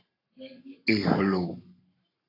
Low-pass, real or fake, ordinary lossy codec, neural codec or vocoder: 5.4 kHz; fake; AAC, 24 kbps; codec, 44.1 kHz, 7.8 kbps, Pupu-Codec